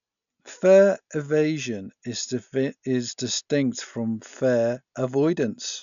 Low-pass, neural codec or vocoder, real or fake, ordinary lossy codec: 7.2 kHz; none; real; none